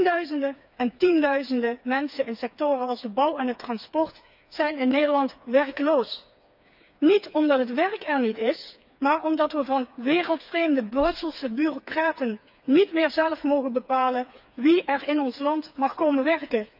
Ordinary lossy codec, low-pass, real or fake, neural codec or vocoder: none; 5.4 kHz; fake; codec, 16 kHz, 4 kbps, FreqCodec, smaller model